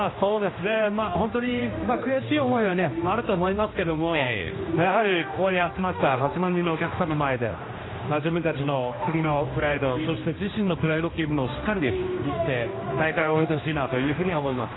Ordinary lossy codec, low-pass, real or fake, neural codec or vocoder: AAC, 16 kbps; 7.2 kHz; fake; codec, 16 kHz, 1 kbps, X-Codec, HuBERT features, trained on general audio